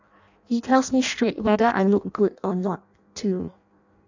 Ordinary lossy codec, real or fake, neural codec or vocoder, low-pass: none; fake; codec, 16 kHz in and 24 kHz out, 0.6 kbps, FireRedTTS-2 codec; 7.2 kHz